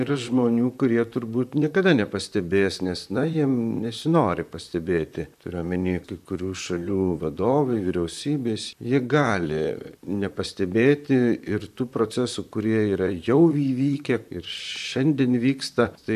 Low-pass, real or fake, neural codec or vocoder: 14.4 kHz; fake; vocoder, 44.1 kHz, 128 mel bands, Pupu-Vocoder